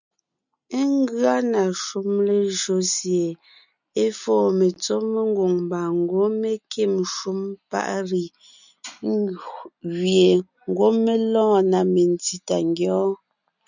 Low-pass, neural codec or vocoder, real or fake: 7.2 kHz; none; real